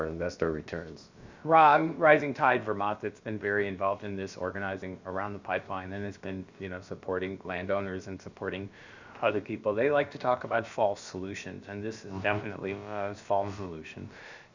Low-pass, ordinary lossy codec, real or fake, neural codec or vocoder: 7.2 kHz; Opus, 64 kbps; fake; codec, 16 kHz, about 1 kbps, DyCAST, with the encoder's durations